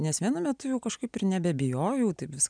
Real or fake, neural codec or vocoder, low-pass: real; none; 9.9 kHz